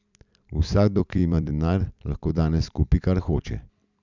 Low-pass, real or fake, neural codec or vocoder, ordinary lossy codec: 7.2 kHz; fake; vocoder, 44.1 kHz, 128 mel bands every 256 samples, BigVGAN v2; none